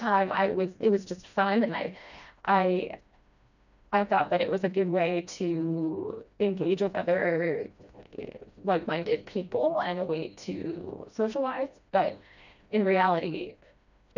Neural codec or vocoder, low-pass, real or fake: codec, 16 kHz, 1 kbps, FreqCodec, smaller model; 7.2 kHz; fake